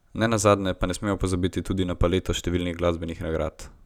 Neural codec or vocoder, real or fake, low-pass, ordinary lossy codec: none; real; 19.8 kHz; none